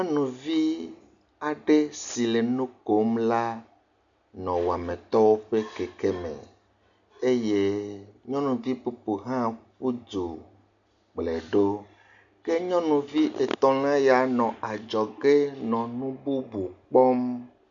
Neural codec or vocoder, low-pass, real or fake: none; 7.2 kHz; real